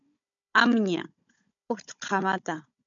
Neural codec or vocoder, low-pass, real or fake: codec, 16 kHz, 16 kbps, FunCodec, trained on Chinese and English, 50 frames a second; 7.2 kHz; fake